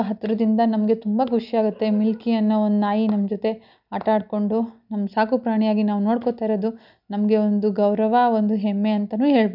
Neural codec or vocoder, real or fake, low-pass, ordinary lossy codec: none; real; 5.4 kHz; none